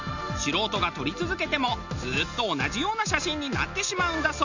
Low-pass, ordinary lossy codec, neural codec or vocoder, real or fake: 7.2 kHz; none; none; real